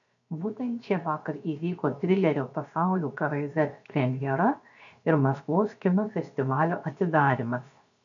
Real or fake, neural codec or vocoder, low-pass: fake; codec, 16 kHz, 0.7 kbps, FocalCodec; 7.2 kHz